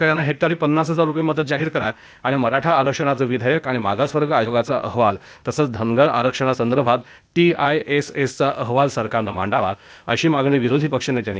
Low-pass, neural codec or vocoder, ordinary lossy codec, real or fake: none; codec, 16 kHz, 0.8 kbps, ZipCodec; none; fake